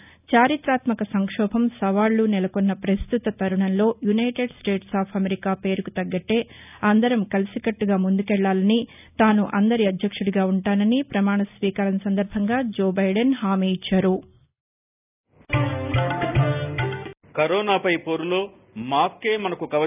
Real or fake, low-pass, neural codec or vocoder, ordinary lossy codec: real; 3.6 kHz; none; none